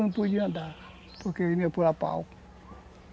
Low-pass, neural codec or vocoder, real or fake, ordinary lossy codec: none; none; real; none